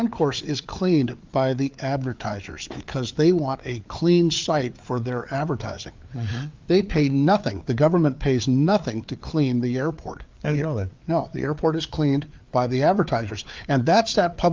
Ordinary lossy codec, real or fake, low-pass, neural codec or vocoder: Opus, 32 kbps; fake; 7.2 kHz; codec, 16 kHz, 4 kbps, FreqCodec, larger model